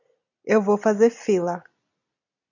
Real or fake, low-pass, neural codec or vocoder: real; 7.2 kHz; none